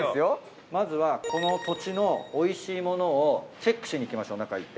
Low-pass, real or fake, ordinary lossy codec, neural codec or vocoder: none; real; none; none